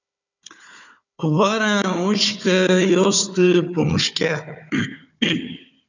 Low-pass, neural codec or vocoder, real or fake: 7.2 kHz; codec, 16 kHz, 4 kbps, FunCodec, trained on Chinese and English, 50 frames a second; fake